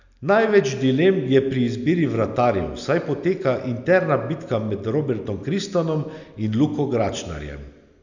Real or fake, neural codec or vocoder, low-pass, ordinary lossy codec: real; none; 7.2 kHz; none